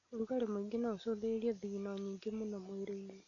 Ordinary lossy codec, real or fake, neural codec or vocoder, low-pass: none; real; none; 7.2 kHz